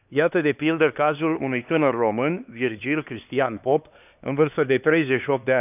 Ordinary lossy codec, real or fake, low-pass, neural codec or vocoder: none; fake; 3.6 kHz; codec, 16 kHz, 2 kbps, X-Codec, HuBERT features, trained on LibriSpeech